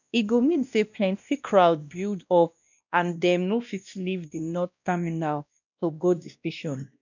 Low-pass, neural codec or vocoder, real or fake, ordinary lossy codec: 7.2 kHz; codec, 16 kHz, 1 kbps, X-Codec, WavLM features, trained on Multilingual LibriSpeech; fake; none